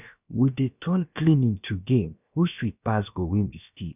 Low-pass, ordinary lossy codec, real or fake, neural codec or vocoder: 3.6 kHz; none; fake; codec, 16 kHz, about 1 kbps, DyCAST, with the encoder's durations